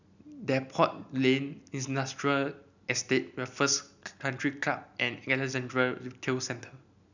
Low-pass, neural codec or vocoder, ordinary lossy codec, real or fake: 7.2 kHz; none; none; real